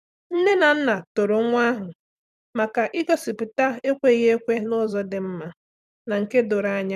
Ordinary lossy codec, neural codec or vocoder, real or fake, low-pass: none; vocoder, 44.1 kHz, 128 mel bands every 256 samples, BigVGAN v2; fake; 14.4 kHz